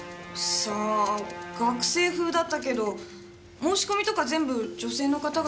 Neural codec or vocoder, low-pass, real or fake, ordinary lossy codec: none; none; real; none